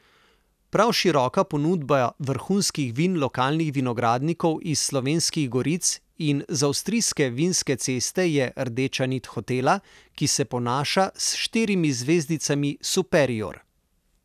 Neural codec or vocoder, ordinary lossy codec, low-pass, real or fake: none; none; 14.4 kHz; real